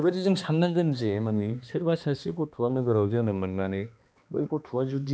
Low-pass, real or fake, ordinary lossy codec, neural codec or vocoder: none; fake; none; codec, 16 kHz, 2 kbps, X-Codec, HuBERT features, trained on balanced general audio